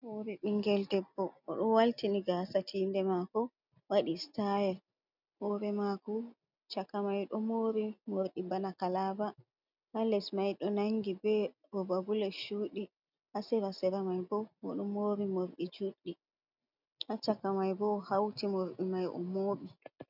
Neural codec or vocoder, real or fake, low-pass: none; real; 5.4 kHz